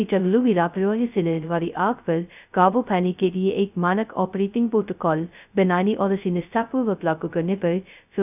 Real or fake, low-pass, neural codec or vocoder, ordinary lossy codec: fake; 3.6 kHz; codec, 16 kHz, 0.2 kbps, FocalCodec; none